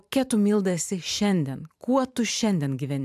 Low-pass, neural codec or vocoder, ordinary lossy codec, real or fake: 14.4 kHz; vocoder, 44.1 kHz, 128 mel bands every 512 samples, BigVGAN v2; AAC, 96 kbps; fake